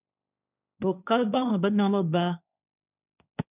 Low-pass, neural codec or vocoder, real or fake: 3.6 kHz; codec, 16 kHz, 1.1 kbps, Voila-Tokenizer; fake